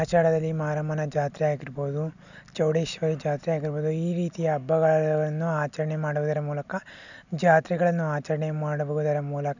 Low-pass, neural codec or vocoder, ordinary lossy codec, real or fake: 7.2 kHz; none; none; real